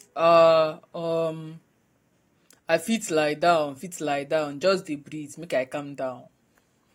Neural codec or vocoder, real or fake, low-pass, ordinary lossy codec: none; real; 19.8 kHz; AAC, 48 kbps